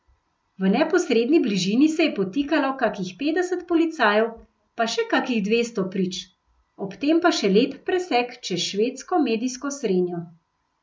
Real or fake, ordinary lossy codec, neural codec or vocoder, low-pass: real; none; none; none